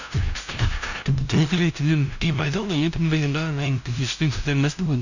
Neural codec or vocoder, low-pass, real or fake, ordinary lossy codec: codec, 16 kHz, 0.5 kbps, FunCodec, trained on LibriTTS, 25 frames a second; 7.2 kHz; fake; none